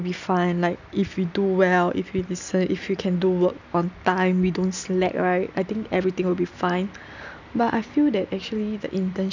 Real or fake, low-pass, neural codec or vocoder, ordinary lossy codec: real; 7.2 kHz; none; none